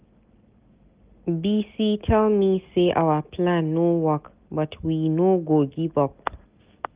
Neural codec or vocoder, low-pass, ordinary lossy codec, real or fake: none; 3.6 kHz; Opus, 32 kbps; real